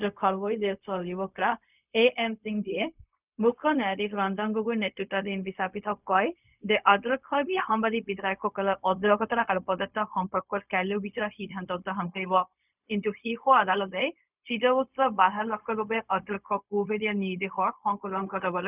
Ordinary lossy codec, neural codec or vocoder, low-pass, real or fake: none; codec, 16 kHz, 0.4 kbps, LongCat-Audio-Codec; 3.6 kHz; fake